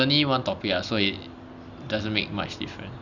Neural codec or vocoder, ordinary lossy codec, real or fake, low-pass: none; none; real; 7.2 kHz